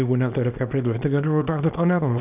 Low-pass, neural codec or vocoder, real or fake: 3.6 kHz; codec, 24 kHz, 0.9 kbps, WavTokenizer, small release; fake